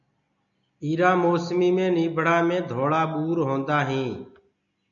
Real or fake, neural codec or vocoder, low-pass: real; none; 7.2 kHz